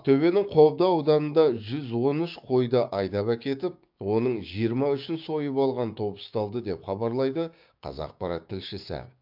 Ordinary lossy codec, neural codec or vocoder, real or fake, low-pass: MP3, 48 kbps; codec, 16 kHz, 6 kbps, DAC; fake; 5.4 kHz